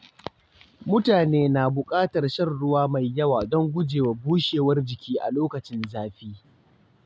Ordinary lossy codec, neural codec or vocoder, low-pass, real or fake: none; none; none; real